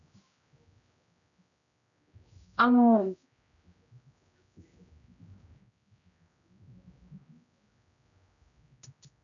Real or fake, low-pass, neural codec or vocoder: fake; 7.2 kHz; codec, 16 kHz, 0.5 kbps, X-Codec, HuBERT features, trained on general audio